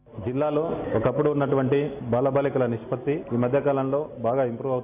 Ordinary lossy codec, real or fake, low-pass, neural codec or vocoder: none; real; 3.6 kHz; none